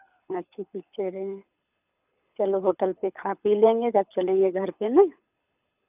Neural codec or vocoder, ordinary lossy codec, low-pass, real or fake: codec, 24 kHz, 6 kbps, HILCodec; none; 3.6 kHz; fake